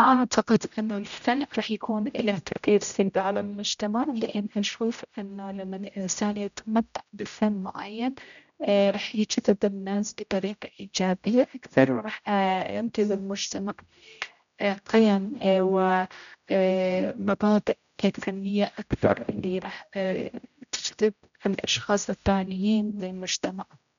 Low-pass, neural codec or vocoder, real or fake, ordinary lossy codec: 7.2 kHz; codec, 16 kHz, 0.5 kbps, X-Codec, HuBERT features, trained on general audio; fake; none